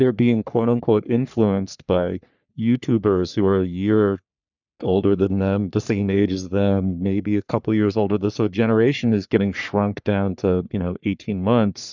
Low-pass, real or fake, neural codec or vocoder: 7.2 kHz; fake; codec, 44.1 kHz, 3.4 kbps, Pupu-Codec